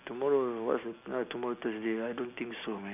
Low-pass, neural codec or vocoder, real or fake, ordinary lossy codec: 3.6 kHz; none; real; none